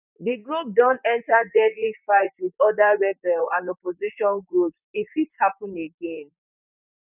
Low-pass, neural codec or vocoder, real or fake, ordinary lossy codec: 3.6 kHz; vocoder, 44.1 kHz, 128 mel bands, Pupu-Vocoder; fake; none